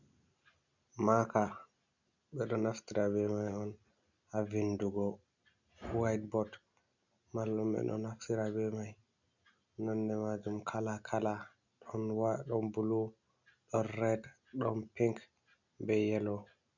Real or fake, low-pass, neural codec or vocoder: real; 7.2 kHz; none